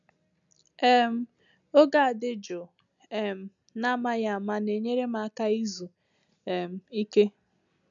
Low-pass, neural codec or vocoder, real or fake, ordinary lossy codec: 7.2 kHz; none; real; none